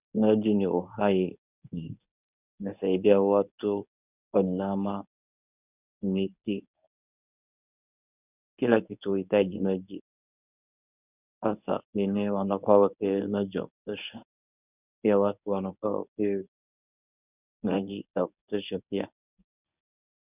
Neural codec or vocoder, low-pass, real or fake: codec, 24 kHz, 0.9 kbps, WavTokenizer, medium speech release version 1; 3.6 kHz; fake